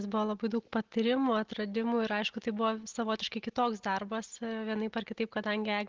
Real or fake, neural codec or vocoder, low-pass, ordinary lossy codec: real; none; 7.2 kHz; Opus, 16 kbps